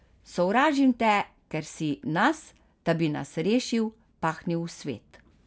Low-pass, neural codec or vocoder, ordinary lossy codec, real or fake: none; none; none; real